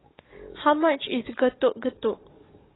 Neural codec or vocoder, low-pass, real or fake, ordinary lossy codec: codec, 44.1 kHz, 7.8 kbps, DAC; 7.2 kHz; fake; AAC, 16 kbps